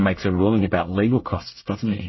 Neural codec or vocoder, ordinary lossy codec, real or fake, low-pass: codec, 24 kHz, 1 kbps, SNAC; MP3, 24 kbps; fake; 7.2 kHz